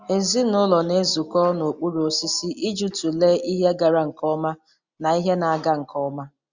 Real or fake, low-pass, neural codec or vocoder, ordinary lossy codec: real; 7.2 kHz; none; Opus, 64 kbps